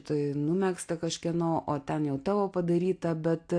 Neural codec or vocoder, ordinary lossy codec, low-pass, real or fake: none; AAC, 48 kbps; 9.9 kHz; real